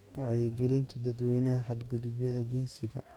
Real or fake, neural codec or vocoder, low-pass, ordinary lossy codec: fake; codec, 44.1 kHz, 2.6 kbps, DAC; 19.8 kHz; none